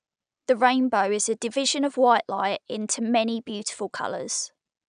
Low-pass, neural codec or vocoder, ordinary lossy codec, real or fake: 9.9 kHz; none; none; real